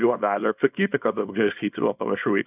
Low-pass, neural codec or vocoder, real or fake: 3.6 kHz; codec, 24 kHz, 0.9 kbps, WavTokenizer, small release; fake